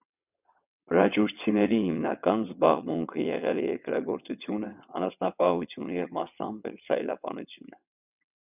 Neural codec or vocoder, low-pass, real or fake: vocoder, 22.05 kHz, 80 mel bands, WaveNeXt; 3.6 kHz; fake